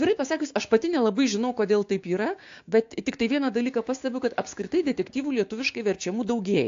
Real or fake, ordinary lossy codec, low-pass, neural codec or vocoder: fake; AAC, 48 kbps; 7.2 kHz; codec, 16 kHz, 6 kbps, DAC